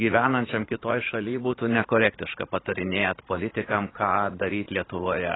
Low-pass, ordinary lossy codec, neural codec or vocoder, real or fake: 7.2 kHz; AAC, 16 kbps; vocoder, 44.1 kHz, 80 mel bands, Vocos; fake